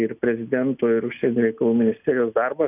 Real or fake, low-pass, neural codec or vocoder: real; 3.6 kHz; none